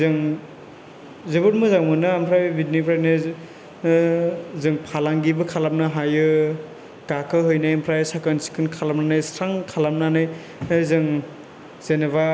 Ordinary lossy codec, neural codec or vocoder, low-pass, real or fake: none; none; none; real